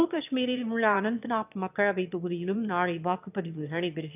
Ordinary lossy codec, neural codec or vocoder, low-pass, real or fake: none; autoencoder, 22.05 kHz, a latent of 192 numbers a frame, VITS, trained on one speaker; 3.6 kHz; fake